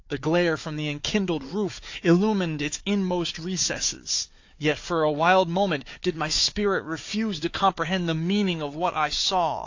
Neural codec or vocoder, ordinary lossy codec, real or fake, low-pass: codec, 16 kHz, 4 kbps, FunCodec, trained on Chinese and English, 50 frames a second; AAC, 48 kbps; fake; 7.2 kHz